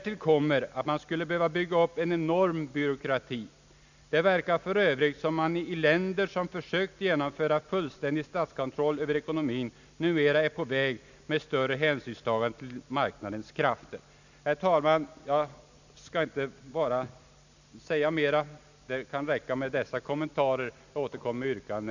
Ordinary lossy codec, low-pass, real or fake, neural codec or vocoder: none; 7.2 kHz; real; none